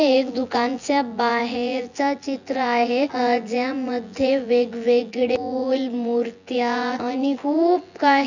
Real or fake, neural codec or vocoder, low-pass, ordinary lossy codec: fake; vocoder, 24 kHz, 100 mel bands, Vocos; 7.2 kHz; none